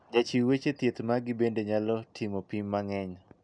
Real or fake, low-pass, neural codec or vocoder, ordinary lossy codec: real; 9.9 kHz; none; none